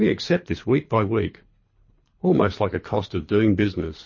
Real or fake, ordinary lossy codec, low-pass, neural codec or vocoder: fake; MP3, 32 kbps; 7.2 kHz; codec, 24 kHz, 3 kbps, HILCodec